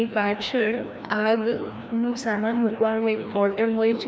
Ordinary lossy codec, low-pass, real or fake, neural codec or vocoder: none; none; fake; codec, 16 kHz, 1 kbps, FreqCodec, larger model